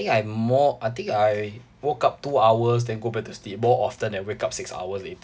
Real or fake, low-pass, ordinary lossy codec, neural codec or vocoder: real; none; none; none